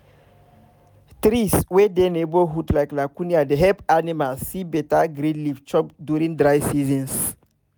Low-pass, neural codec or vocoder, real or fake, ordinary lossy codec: none; none; real; none